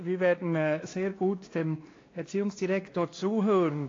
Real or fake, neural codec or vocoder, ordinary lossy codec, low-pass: fake; codec, 16 kHz, 2 kbps, X-Codec, WavLM features, trained on Multilingual LibriSpeech; AAC, 32 kbps; 7.2 kHz